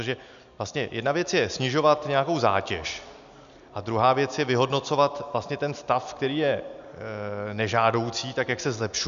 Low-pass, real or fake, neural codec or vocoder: 7.2 kHz; real; none